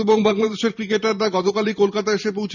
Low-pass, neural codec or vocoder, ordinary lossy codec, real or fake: 7.2 kHz; vocoder, 44.1 kHz, 128 mel bands every 512 samples, BigVGAN v2; none; fake